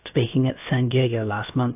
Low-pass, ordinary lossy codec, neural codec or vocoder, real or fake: 3.6 kHz; AAC, 32 kbps; codec, 16 kHz, about 1 kbps, DyCAST, with the encoder's durations; fake